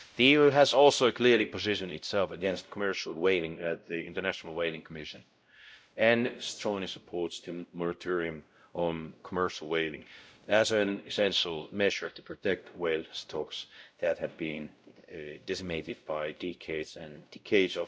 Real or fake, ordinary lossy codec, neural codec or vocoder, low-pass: fake; none; codec, 16 kHz, 0.5 kbps, X-Codec, WavLM features, trained on Multilingual LibriSpeech; none